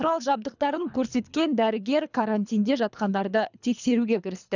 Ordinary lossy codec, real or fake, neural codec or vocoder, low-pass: none; fake; codec, 24 kHz, 3 kbps, HILCodec; 7.2 kHz